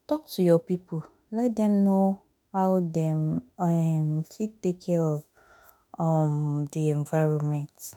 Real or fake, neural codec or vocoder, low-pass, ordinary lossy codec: fake; autoencoder, 48 kHz, 32 numbers a frame, DAC-VAE, trained on Japanese speech; 19.8 kHz; none